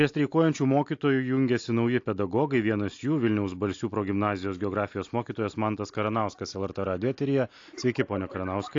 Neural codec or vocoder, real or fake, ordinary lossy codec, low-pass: none; real; AAC, 48 kbps; 7.2 kHz